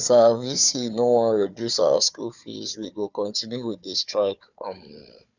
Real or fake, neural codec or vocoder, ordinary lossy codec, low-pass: fake; codec, 16 kHz, 4 kbps, FunCodec, trained on Chinese and English, 50 frames a second; none; 7.2 kHz